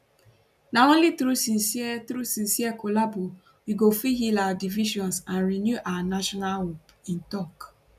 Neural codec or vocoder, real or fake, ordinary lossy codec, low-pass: none; real; none; 14.4 kHz